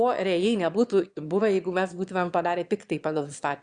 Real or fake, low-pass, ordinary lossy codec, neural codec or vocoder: fake; 9.9 kHz; Opus, 64 kbps; autoencoder, 22.05 kHz, a latent of 192 numbers a frame, VITS, trained on one speaker